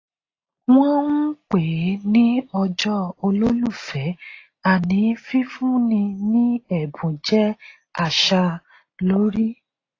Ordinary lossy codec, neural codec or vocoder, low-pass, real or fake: AAC, 32 kbps; none; 7.2 kHz; real